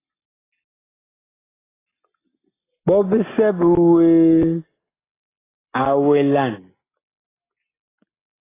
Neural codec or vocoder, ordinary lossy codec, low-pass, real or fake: none; AAC, 16 kbps; 3.6 kHz; real